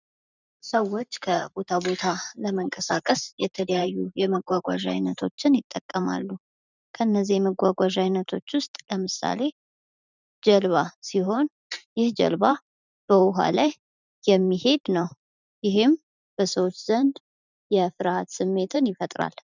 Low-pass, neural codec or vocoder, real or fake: 7.2 kHz; vocoder, 44.1 kHz, 128 mel bands every 512 samples, BigVGAN v2; fake